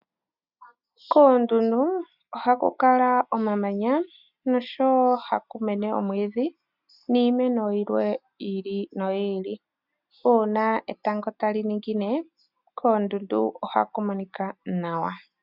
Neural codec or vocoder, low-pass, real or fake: none; 5.4 kHz; real